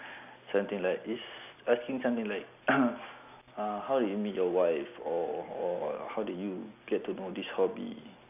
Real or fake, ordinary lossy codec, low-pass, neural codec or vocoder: real; none; 3.6 kHz; none